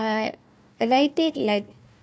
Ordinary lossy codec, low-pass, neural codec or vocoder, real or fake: none; none; codec, 16 kHz, 1 kbps, FunCodec, trained on Chinese and English, 50 frames a second; fake